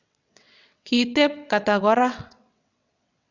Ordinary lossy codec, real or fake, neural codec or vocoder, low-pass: none; fake; vocoder, 22.05 kHz, 80 mel bands, WaveNeXt; 7.2 kHz